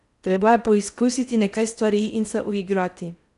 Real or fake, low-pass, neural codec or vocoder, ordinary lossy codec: fake; 10.8 kHz; codec, 16 kHz in and 24 kHz out, 0.6 kbps, FocalCodec, streaming, 4096 codes; none